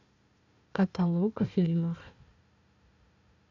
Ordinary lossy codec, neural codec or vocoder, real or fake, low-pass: AAC, 48 kbps; codec, 16 kHz, 1 kbps, FunCodec, trained on Chinese and English, 50 frames a second; fake; 7.2 kHz